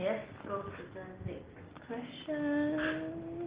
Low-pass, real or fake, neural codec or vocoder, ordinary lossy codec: 3.6 kHz; real; none; Opus, 16 kbps